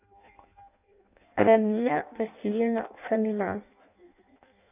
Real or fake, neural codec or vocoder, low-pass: fake; codec, 16 kHz in and 24 kHz out, 0.6 kbps, FireRedTTS-2 codec; 3.6 kHz